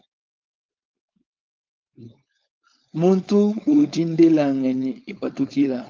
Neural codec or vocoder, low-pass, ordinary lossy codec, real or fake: codec, 16 kHz, 4.8 kbps, FACodec; 7.2 kHz; Opus, 24 kbps; fake